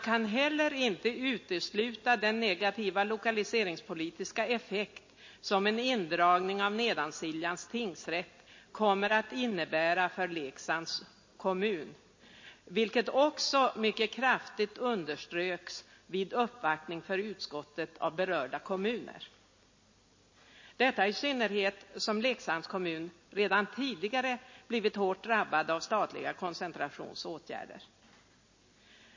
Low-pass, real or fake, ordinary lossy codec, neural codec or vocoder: 7.2 kHz; real; MP3, 32 kbps; none